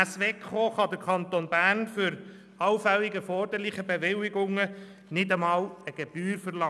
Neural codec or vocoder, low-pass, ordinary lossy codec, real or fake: none; none; none; real